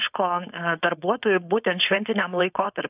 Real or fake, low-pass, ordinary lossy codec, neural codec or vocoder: fake; 3.6 kHz; AAC, 32 kbps; codec, 16 kHz, 4.8 kbps, FACodec